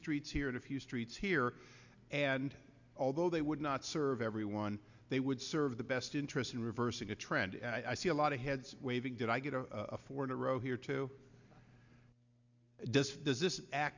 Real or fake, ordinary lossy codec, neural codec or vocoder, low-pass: real; Opus, 64 kbps; none; 7.2 kHz